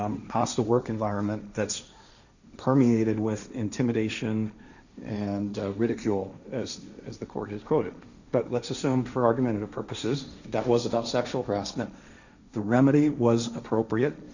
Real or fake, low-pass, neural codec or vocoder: fake; 7.2 kHz; codec, 16 kHz, 1.1 kbps, Voila-Tokenizer